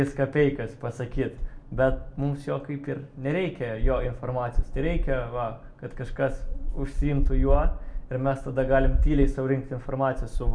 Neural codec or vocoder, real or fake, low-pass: none; real; 9.9 kHz